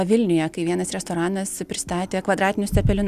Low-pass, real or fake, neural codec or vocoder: 14.4 kHz; real; none